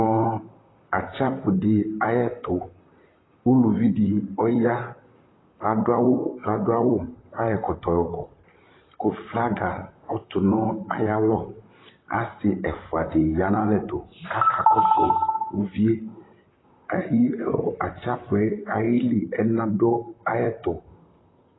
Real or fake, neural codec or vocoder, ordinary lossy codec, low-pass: fake; vocoder, 44.1 kHz, 128 mel bands, Pupu-Vocoder; AAC, 16 kbps; 7.2 kHz